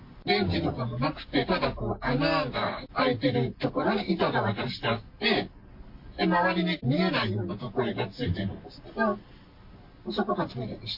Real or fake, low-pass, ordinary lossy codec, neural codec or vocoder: real; 5.4 kHz; MP3, 32 kbps; none